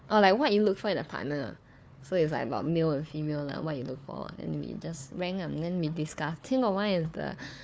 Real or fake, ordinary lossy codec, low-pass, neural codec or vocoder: fake; none; none; codec, 16 kHz, 4 kbps, FunCodec, trained on Chinese and English, 50 frames a second